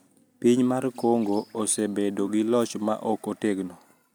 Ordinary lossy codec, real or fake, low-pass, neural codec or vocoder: none; real; none; none